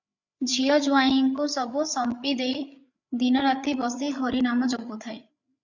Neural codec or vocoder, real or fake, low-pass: codec, 16 kHz, 8 kbps, FreqCodec, larger model; fake; 7.2 kHz